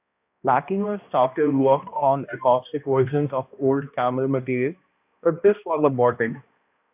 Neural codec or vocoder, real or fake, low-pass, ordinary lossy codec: codec, 16 kHz, 1 kbps, X-Codec, HuBERT features, trained on balanced general audio; fake; 3.6 kHz; AAC, 32 kbps